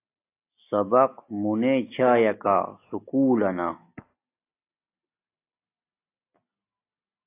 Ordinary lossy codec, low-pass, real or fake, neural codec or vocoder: AAC, 24 kbps; 3.6 kHz; real; none